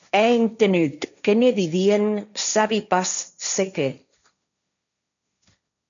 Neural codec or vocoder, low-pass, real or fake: codec, 16 kHz, 1.1 kbps, Voila-Tokenizer; 7.2 kHz; fake